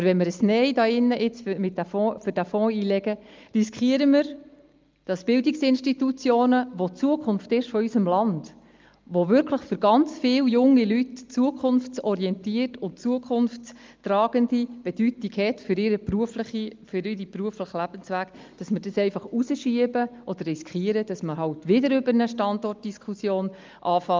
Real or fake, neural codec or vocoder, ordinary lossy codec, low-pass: real; none; Opus, 32 kbps; 7.2 kHz